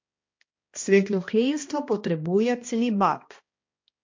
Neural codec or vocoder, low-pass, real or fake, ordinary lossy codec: codec, 16 kHz, 1 kbps, X-Codec, HuBERT features, trained on balanced general audio; 7.2 kHz; fake; MP3, 48 kbps